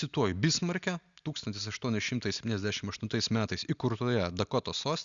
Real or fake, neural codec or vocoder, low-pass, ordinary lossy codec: real; none; 7.2 kHz; Opus, 64 kbps